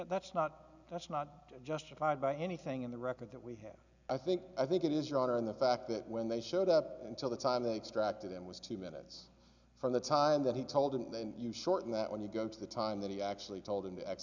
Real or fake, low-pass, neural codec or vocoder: real; 7.2 kHz; none